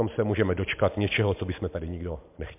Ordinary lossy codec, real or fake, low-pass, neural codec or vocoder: MP3, 32 kbps; real; 3.6 kHz; none